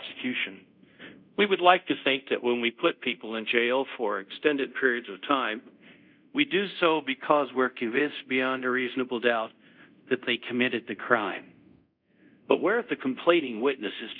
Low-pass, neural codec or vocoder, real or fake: 5.4 kHz; codec, 24 kHz, 0.5 kbps, DualCodec; fake